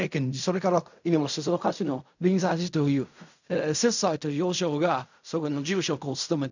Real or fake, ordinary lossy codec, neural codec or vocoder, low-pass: fake; none; codec, 16 kHz in and 24 kHz out, 0.4 kbps, LongCat-Audio-Codec, fine tuned four codebook decoder; 7.2 kHz